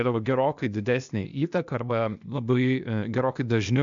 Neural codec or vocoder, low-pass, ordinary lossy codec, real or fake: codec, 16 kHz, 0.8 kbps, ZipCodec; 7.2 kHz; MP3, 64 kbps; fake